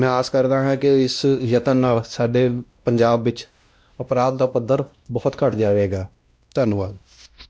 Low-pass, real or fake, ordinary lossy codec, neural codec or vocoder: none; fake; none; codec, 16 kHz, 1 kbps, X-Codec, WavLM features, trained on Multilingual LibriSpeech